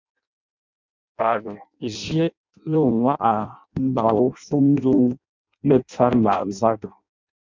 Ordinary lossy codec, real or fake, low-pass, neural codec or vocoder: MP3, 64 kbps; fake; 7.2 kHz; codec, 16 kHz in and 24 kHz out, 0.6 kbps, FireRedTTS-2 codec